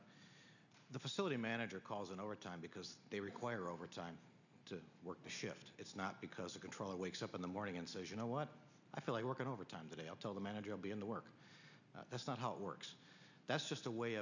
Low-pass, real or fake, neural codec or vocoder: 7.2 kHz; real; none